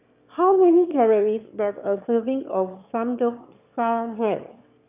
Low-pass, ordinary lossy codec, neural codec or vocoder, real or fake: 3.6 kHz; none; autoencoder, 22.05 kHz, a latent of 192 numbers a frame, VITS, trained on one speaker; fake